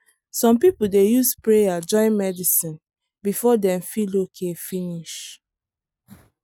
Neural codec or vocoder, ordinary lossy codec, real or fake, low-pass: none; none; real; none